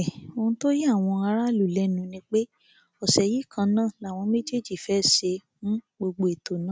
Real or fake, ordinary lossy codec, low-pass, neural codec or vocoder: real; none; none; none